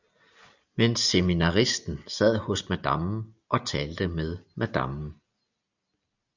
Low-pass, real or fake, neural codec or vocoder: 7.2 kHz; real; none